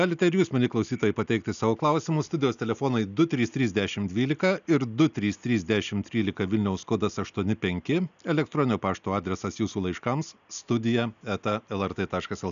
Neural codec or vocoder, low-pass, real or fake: none; 7.2 kHz; real